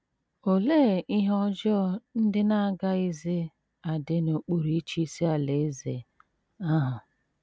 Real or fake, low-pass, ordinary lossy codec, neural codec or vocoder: real; none; none; none